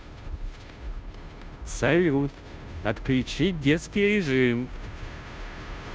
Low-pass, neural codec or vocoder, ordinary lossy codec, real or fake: none; codec, 16 kHz, 0.5 kbps, FunCodec, trained on Chinese and English, 25 frames a second; none; fake